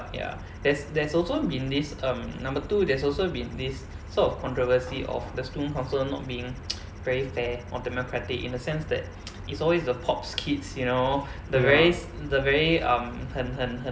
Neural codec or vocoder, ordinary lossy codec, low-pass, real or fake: none; none; none; real